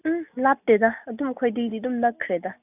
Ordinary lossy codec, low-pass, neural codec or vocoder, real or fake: none; 3.6 kHz; none; real